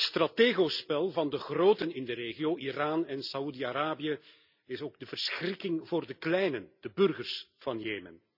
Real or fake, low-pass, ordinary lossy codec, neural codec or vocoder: real; 5.4 kHz; none; none